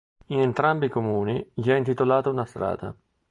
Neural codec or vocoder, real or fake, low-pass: none; real; 10.8 kHz